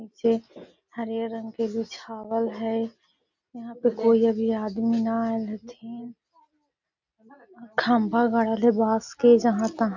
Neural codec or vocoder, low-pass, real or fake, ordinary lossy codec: none; 7.2 kHz; real; none